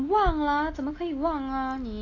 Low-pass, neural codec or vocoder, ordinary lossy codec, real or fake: 7.2 kHz; none; AAC, 32 kbps; real